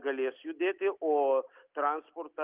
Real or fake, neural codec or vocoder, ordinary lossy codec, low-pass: real; none; Opus, 24 kbps; 3.6 kHz